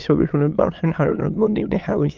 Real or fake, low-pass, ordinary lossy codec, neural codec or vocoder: fake; 7.2 kHz; Opus, 24 kbps; autoencoder, 22.05 kHz, a latent of 192 numbers a frame, VITS, trained on many speakers